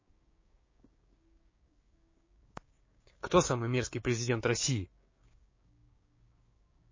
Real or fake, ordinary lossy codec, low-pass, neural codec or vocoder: fake; MP3, 32 kbps; 7.2 kHz; codec, 16 kHz, 6 kbps, DAC